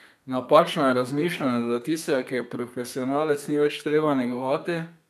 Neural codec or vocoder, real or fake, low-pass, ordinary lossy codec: codec, 32 kHz, 1.9 kbps, SNAC; fake; 14.4 kHz; none